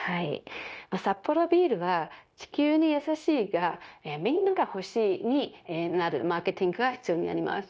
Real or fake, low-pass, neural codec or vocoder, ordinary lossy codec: fake; none; codec, 16 kHz, 0.9 kbps, LongCat-Audio-Codec; none